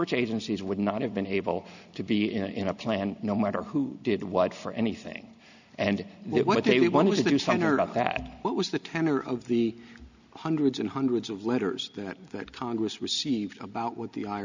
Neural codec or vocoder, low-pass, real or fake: none; 7.2 kHz; real